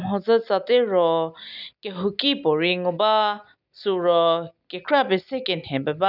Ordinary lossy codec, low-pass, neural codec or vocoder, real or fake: none; 5.4 kHz; none; real